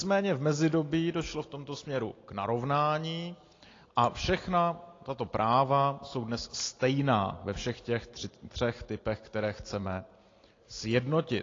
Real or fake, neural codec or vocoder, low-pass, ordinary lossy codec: real; none; 7.2 kHz; AAC, 32 kbps